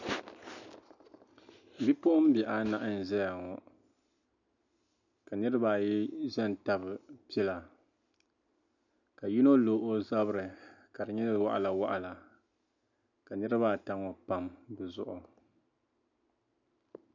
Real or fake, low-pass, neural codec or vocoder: real; 7.2 kHz; none